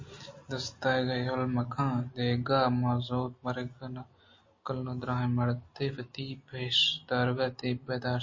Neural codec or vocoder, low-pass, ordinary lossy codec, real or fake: none; 7.2 kHz; MP3, 32 kbps; real